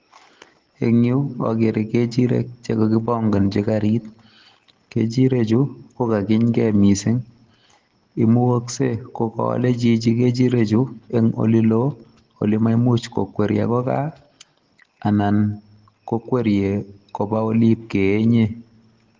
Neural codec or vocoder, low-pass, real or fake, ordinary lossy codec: none; 7.2 kHz; real; Opus, 16 kbps